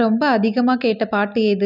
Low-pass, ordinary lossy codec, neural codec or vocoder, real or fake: 5.4 kHz; none; none; real